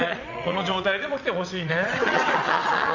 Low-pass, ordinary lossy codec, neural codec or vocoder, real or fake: 7.2 kHz; none; vocoder, 22.05 kHz, 80 mel bands, WaveNeXt; fake